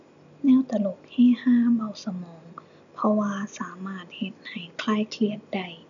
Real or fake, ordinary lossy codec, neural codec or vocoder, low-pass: real; none; none; 7.2 kHz